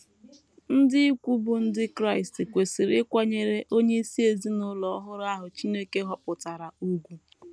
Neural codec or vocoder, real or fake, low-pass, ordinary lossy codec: none; real; none; none